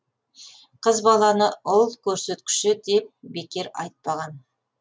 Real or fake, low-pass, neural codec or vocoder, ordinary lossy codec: real; none; none; none